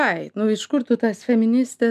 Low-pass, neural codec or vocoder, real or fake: 14.4 kHz; none; real